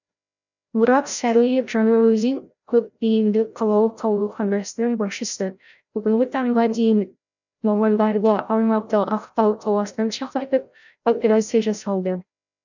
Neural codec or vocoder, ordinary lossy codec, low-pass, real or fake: codec, 16 kHz, 0.5 kbps, FreqCodec, larger model; none; 7.2 kHz; fake